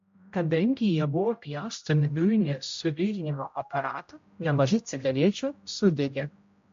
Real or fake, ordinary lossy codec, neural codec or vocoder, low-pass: fake; MP3, 48 kbps; codec, 16 kHz, 0.5 kbps, X-Codec, HuBERT features, trained on general audio; 7.2 kHz